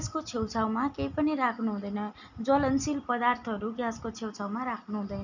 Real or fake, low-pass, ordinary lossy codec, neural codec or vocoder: real; 7.2 kHz; none; none